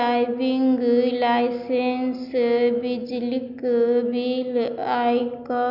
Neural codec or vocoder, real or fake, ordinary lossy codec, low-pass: none; real; none; 5.4 kHz